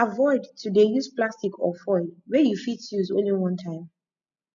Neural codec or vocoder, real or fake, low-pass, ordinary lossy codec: none; real; 7.2 kHz; none